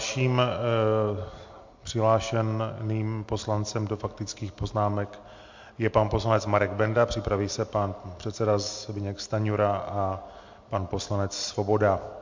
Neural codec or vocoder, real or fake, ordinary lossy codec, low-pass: none; real; MP3, 48 kbps; 7.2 kHz